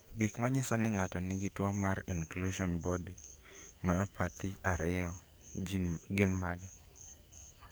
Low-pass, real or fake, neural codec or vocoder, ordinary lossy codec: none; fake; codec, 44.1 kHz, 2.6 kbps, SNAC; none